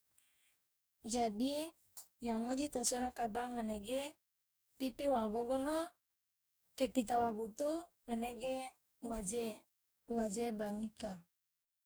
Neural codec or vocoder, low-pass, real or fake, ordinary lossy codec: codec, 44.1 kHz, 2.6 kbps, DAC; none; fake; none